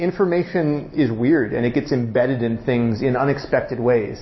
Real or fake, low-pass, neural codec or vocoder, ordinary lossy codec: real; 7.2 kHz; none; MP3, 24 kbps